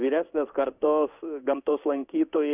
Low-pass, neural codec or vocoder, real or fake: 3.6 kHz; codec, 16 kHz in and 24 kHz out, 1 kbps, XY-Tokenizer; fake